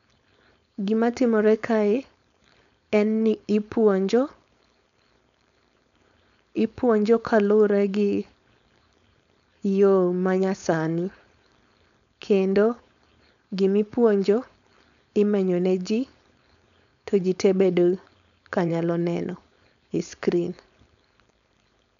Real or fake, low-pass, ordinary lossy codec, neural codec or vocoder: fake; 7.2 kHz; none; codec, 16 kHz, 4.8 kbps, FACodec